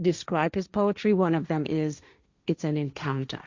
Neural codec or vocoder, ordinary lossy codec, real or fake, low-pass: codec, 16 kHz, 1.1 kbps, Voila-Tokenizer; Opus, 64 kbps; fake; 7.2 kHz